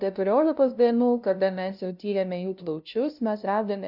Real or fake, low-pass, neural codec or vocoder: fake; 5.4 kHz; codec, 16 kHz, 0.5 kbps, FunCodec, trained on LibriTTS, 25 frames a second